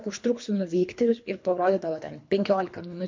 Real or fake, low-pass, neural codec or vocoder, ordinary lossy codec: fake; 7.2 kHz; codec, 24 kHz, 3 kbps, HILCodec; MP3, 48 kbps